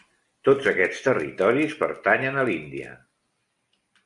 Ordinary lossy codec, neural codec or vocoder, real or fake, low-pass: MP3, 64 kbps; none; real; 10.8 kHz